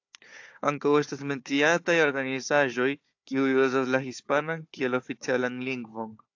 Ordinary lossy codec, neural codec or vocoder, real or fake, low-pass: AAC, 48 kbps; codec, 16 kHz, 4 kbps, FunCodec, trained on Chinese and English, 50 frames a second; fake; 7.2 kHz